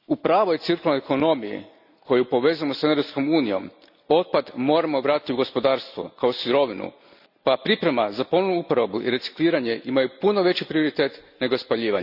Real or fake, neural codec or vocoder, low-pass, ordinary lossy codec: real; none; 5.4 kHz; none